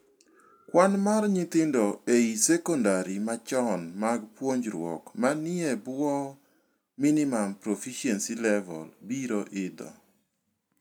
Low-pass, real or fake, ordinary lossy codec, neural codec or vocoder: none; real; none; none